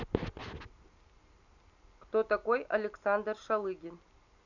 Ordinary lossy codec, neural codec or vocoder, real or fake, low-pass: none; none; real; 7.2 kHz